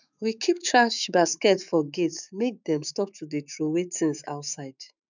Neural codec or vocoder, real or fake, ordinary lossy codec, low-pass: autoencoder, 48 kHz, 128 numbers a frame, DAC-VAE, trained on Japanese speech; fake; none; 7.2 kHz